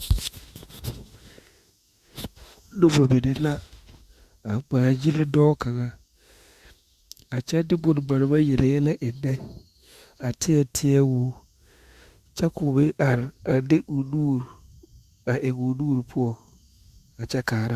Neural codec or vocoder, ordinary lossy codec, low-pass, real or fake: autoencoder, 48 kHz, 32 numbers a frame, DAC-VAE, trained on Japanese speech; Opus, 64 kbps; 14.4 kHz; fake